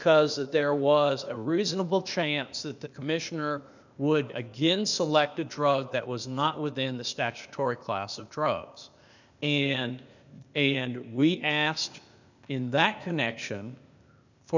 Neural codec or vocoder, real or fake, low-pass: codec, 16 kHz, 0.8 kbps, ZipCodec; fake; 7.2 kHz